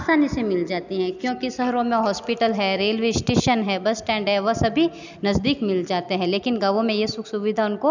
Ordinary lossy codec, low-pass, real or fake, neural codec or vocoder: none; 7.2 kHz; real; none